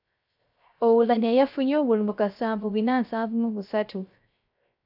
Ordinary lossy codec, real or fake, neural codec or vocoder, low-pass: AAC, 48 kbps; fake; codec, 16 kHz, 0.3 kbps, FocalCodec; 5.4 kHz